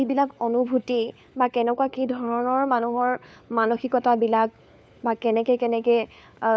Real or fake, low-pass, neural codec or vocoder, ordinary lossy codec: fake; none; codec, 16 kHz, 4 kbps, FunCodec, trained on LibriTTS, 50 frames a second; none